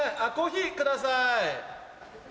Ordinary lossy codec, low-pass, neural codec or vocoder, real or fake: none; none; none; real